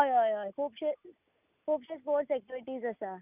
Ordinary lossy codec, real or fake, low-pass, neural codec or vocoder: none; real; 3.6 kHz; none